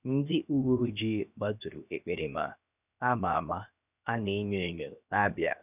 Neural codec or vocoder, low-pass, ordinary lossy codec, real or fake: codec, 16 kHz, 0.7 kbps, FocalCodec; 3.6 kHz; none; fake